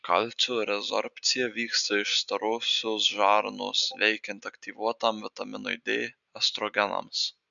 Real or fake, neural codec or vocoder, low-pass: real; none; 7.2 kHz